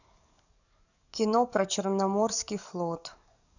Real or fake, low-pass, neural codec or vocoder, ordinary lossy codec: fake; 7.2 kHz; codec, 44.1 kHz, 7.8 kbps, DAC; none